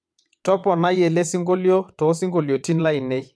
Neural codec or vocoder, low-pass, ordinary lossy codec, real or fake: vocoder, 22.05 kHz, 80 mel bands, WaveNeXt; none; none; fake